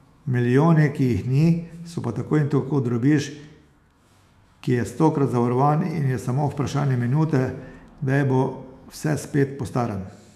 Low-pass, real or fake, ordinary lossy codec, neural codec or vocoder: 14.4 kHz; fake; none; autoencoder, 48 kHz, 128 numbers a frame, DAC-VAE, trained on Japanese speech